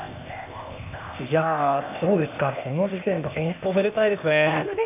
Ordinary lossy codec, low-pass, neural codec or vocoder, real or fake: AAC, 32 kbps; 3.6 kHz; codec, 16 kHz, 0.8 kbps, ZipCodec; fake